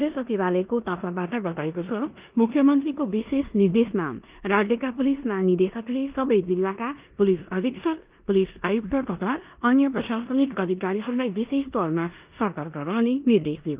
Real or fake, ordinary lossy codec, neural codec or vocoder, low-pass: fake; Opus, 24 kbps; codec, 16 kHz in and 24 kHz out, 0.9 kbps, LongCat-Audio-Codec, four codebook decoder; 3.6 kHz